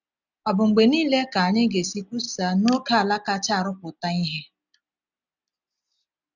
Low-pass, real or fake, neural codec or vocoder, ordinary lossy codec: 7.2 kHz; real; none; none